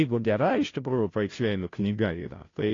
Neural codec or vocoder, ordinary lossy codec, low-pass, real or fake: codec, 16 kHz, 0.5 kbps, FunCodec, trained on Chinese and English, 25 frames a second; AAC, 32 kbps; 7.2 kHz; fake